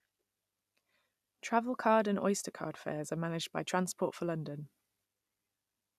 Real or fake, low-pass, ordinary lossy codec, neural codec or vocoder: real; 14.4 kHz; none; none